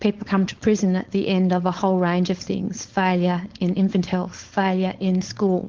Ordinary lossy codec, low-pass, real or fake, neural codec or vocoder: Opus, 16 kbps; 7.2 kHz; fake; codec, 16 kHz, 4.8 kbps, FACodec